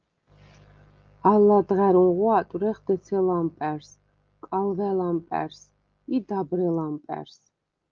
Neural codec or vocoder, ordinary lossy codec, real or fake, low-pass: none; Opus, 24 kbps; real; 7.2 kHz